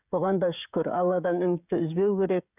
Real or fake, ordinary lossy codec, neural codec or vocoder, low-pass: fake; none; codec, 16 kHz, 16 kbps, FreqCodec, smaller model; 3.6 kHz